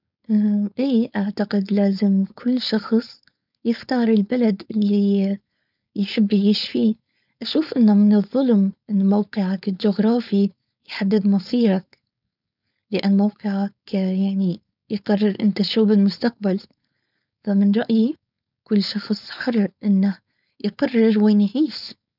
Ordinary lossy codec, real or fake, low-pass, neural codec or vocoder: none; fake; 5.4 kHz; codec, 16 kHz, 4.8 kbps, FACodec